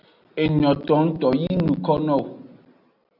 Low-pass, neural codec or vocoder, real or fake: 5.4 kHz; none; real